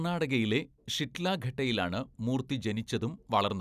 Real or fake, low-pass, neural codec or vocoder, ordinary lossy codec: real; 14.4 kHz; none; none